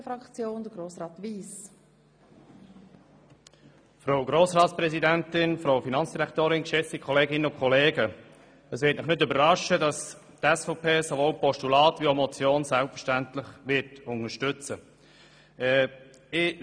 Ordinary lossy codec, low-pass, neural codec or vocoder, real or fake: none; 9.9 kHz; none; real